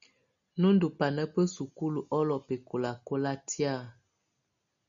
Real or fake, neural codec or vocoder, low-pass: real; none; 7.2 kHz